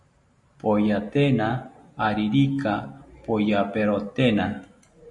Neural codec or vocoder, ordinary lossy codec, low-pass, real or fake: none; MP3, 48 kbps; 10.8 kHz; real